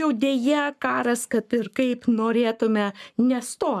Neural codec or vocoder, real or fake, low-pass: codec, 44.1 kHz, 7.8 kbps, Pupu-Codec; fake; 14.4 kHz